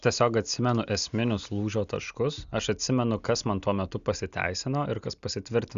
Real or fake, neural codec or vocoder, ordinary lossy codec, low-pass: real; none; Opus, 64 kbps; 7.2 kHz